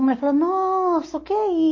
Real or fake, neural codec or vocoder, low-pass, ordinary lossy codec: real; none; 7.2 kHz; MP3, 32 kbps